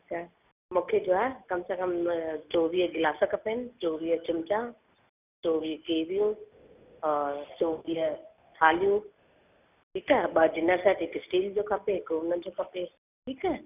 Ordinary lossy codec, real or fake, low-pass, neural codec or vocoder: none; real; 3.6 kHz; none